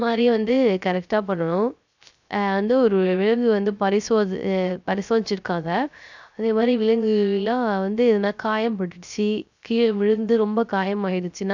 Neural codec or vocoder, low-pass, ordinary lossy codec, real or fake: codec, 16 kHz, 0.3 kbps, FocalCodec; 7.2 kHz; none; fake